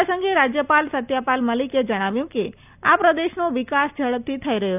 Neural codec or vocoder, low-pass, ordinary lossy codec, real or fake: none; 3.6 kHz; none; real